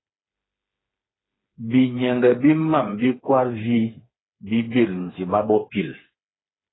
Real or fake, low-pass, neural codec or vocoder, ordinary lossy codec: fake; 7.2 kHz; codec, 16 kHz, 4 kbps, FreqCodec, smaller model; AAC, 16 kbps